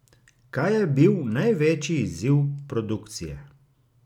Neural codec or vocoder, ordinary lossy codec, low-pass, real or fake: none; none; 19.8 kHz; real